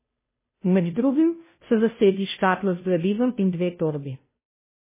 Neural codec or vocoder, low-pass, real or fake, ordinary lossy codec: codec, 16 kHz, 0.5 kbps, FunCodec, trained on Chinese and English, 25 frames a second; 3.6 kHz; fake; MP3, 16 kbps